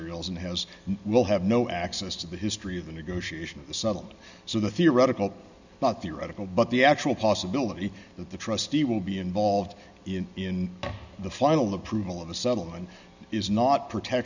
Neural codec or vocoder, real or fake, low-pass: none; real; 7.2 kHz